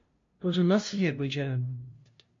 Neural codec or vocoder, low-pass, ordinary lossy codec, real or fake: codec, 16 kHz, 0.5 kbps, FunCodec, trained on LibriTTS, 25 frames a second; 7.2 kHz; MP3, 48 kbps; fake